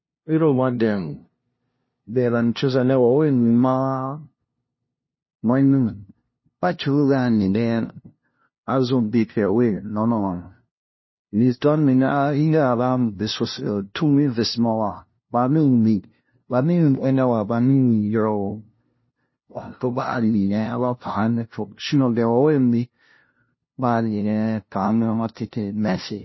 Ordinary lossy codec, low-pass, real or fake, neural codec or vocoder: MP3, 24 kbps; 7.2 kHz; fake; codec, 16 kHz, 0.5 kbps, FunCodec, trained on LibriTTS, 25 frames a second